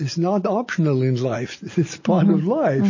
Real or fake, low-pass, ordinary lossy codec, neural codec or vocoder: real; 7.2 kHz; MP3, 32 kbps; none